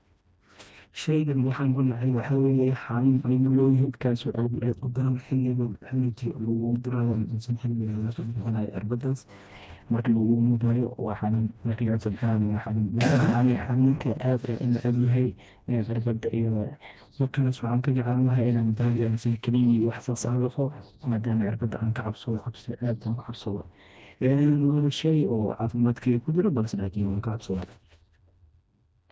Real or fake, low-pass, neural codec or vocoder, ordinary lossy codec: fake; none; codec, 16 kHz, 1 kbps, FreqCodec, smaller model; none